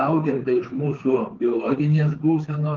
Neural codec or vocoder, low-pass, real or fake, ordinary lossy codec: codec, 16 kHz, 4 kbps, FreqCodec, larger model; 7.2 kHz; fake; Opus, 16 kbps